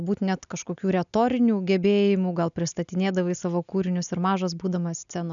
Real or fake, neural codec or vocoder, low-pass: real; none; 7.2 kHz